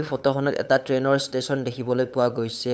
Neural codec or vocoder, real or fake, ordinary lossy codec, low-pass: codec, 16 kHz, 8 kbps, FunCodec, trained on LibriTTS, 25 frames a second; fake; none; none